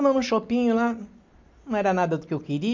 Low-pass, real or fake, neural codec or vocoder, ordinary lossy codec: 7.2 kHz; real; none; MP3, 64 kbps